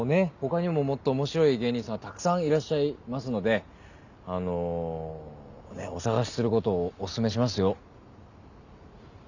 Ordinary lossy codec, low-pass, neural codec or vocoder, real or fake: none; 7.2 kHz; none; real